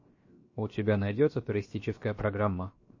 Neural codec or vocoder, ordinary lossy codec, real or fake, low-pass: codec, 16 kHz, 0.7 kbps, FocalCodec; MP3, 32 kbps; fake; 7.2 kHz